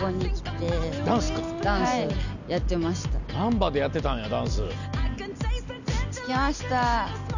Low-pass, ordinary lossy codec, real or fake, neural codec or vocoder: 7.2 kHz; none; real; none